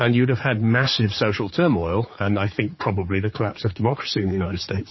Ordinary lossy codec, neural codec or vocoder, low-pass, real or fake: MP3, 24 kbps; codec, 16 kHz, 4 kbps, X-Codec, HuBERT features, trained on general audio; 7.2 kHz; fake